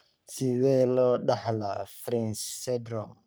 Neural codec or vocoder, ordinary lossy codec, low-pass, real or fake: codec, 44.1 kHz, 3.4 kbps, Pupu-Codec; none; none; fake